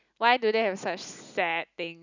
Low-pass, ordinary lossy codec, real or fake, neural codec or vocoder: 7.2 kHz; none; real; none